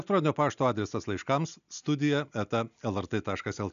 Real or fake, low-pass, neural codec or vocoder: real; 7.2 kHz; none